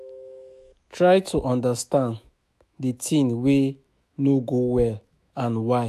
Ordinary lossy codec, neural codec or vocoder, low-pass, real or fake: AAC, 96 kbps; autoencoder, 48 kHz, 128 numbers a frame, DAC-VAE, trained on Japanese speech; 14.4 kHz; fake